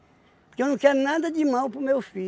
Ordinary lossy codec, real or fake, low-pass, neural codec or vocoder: none; real; none; none